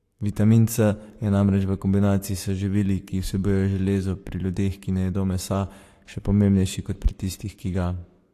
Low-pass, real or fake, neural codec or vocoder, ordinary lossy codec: 14.4 kHz; fake; codec, 44.1 kHz, 7.8 kbps, Pupu-Codec; AAC, 64 kbps